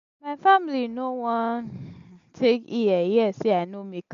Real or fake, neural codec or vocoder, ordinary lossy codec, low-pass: real; none; MP3, 64 kbps; 7.2 kHz